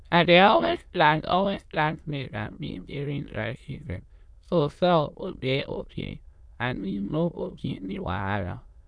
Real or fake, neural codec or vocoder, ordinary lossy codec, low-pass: fake; autoencoder, 22.05 kHz, a latent of 192 numbers a frame, VITS, trained on many speakers; none; none